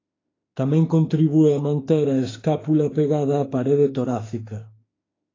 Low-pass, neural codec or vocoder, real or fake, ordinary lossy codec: 7.2 kHz; autoencoder, 48 kHz, 32 numbers a frame, DAC-VAE, trained on Japanese speech; fake; AAC, 32 kbps